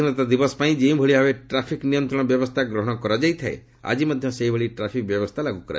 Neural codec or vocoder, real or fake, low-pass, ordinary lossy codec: none; real; none; none